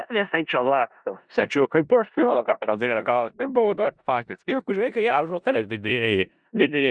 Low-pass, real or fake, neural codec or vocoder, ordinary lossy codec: 9.9 kHz; fake; codec, 16 kHz in and 24 kHz out, 0.4 kbps, LongCat-Audio-Codec, four codebook decoder; Opus, 64 kbps